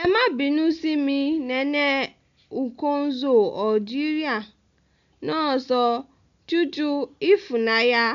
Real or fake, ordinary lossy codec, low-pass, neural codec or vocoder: real; MP3, 64 kbps; 7.2 kHz; none